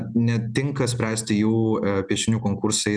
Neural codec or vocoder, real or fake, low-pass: none; real; 9.9 kHz